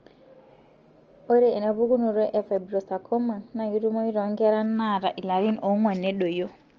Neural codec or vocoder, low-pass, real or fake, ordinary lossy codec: none; 7.2 kHz; real; Opus, 24 kbps